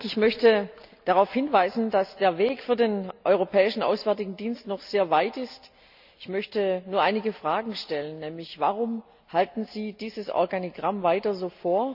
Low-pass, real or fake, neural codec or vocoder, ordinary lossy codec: 5.4 kHz; real; none; none